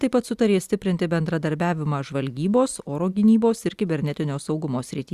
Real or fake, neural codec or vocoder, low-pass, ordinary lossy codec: real; none; 14.4 kHz; Opus, 64 kbps